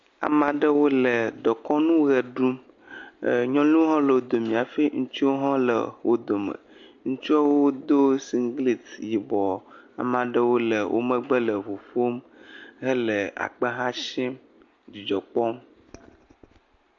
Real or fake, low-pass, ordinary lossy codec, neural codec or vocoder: real; 7.2 kHz; MP3, 48 kbps; none